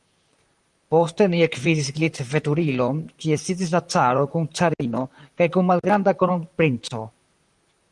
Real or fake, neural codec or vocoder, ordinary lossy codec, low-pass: fake; vocoder, 44.1 kHz, 128 mel bands, Pupu-Vocoder; Opus, 32 kbps; 10.8 kHz